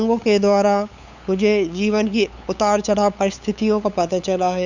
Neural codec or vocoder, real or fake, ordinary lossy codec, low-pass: codec, 16 kHz, 8 kbps, FunCodec, trained on LibriTTS, 25 frames a second; fake; Opus, 64 kbps; 7.2 kHz